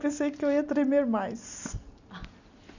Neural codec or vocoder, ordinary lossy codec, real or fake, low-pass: none; none; real; 7.2 kHz